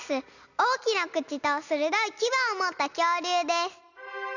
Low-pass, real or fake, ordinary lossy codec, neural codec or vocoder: 7.2 kHz; real; none; none